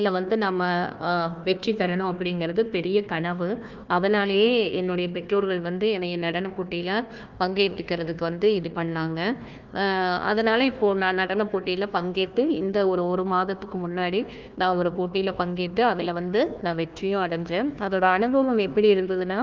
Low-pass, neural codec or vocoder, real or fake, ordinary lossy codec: 7.2 kHz; codec, 16 kHz, 1 kbps, FunCodec, trained on Chinese and English, 50 frames a second; fake; Opus, 24 kbps